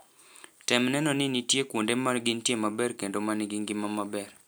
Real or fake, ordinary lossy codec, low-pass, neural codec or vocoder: real; none; none; none